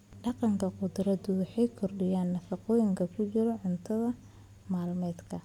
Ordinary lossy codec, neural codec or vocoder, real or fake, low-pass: none; none; real; 19.8 kHz